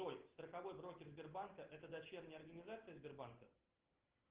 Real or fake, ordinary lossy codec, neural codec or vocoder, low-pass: real; Opus, 16 kbps; none; 3.6 kHz